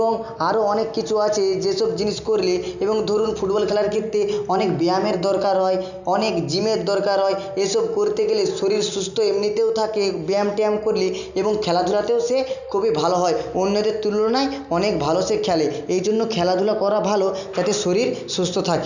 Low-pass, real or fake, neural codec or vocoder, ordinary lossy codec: 7.2 kHz; real; none; none